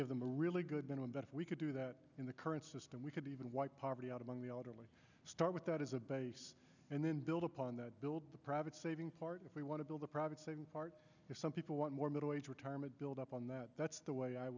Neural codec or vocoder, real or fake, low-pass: none; real; 7.2 kHz